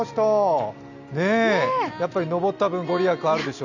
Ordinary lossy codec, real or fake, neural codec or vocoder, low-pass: none; real; none; 7.2 kHz